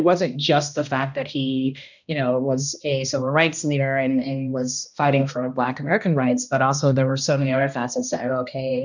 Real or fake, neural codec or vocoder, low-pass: fake; codec, 16 kHz, 1 kbps, X-Codec, HuBERT features, trained on balanced general audio; 7.2 kHz